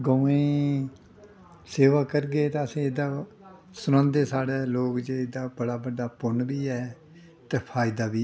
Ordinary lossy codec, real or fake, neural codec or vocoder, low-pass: none; real; none; none